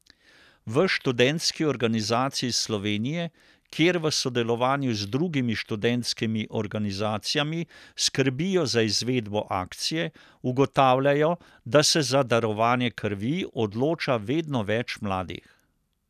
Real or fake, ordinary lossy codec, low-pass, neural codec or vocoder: real; none; 14.4 kHz; none